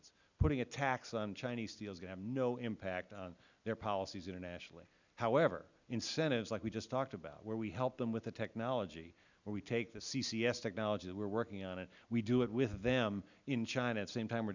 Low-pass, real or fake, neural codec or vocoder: 7.2 kHz; real; none